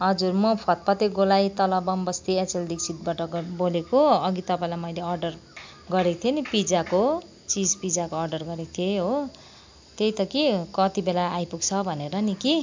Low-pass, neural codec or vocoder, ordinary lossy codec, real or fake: 7.2 kHz; none; MP3, 64 kbps; real